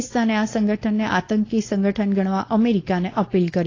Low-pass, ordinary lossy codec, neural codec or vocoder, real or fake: 7.2 kHz; AAC, 32 kbps; codec, 16 kHz, 4.8 kbps, FACodec; fake